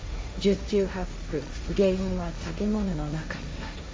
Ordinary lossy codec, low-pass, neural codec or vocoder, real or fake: none; none; codec, 16 kHz, 1.1 kbps, Voila-Tokenizer; fake